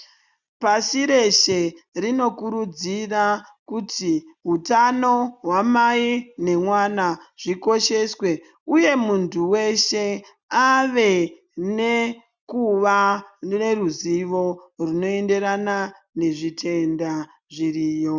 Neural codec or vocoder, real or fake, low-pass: none; real; 7.2 kHz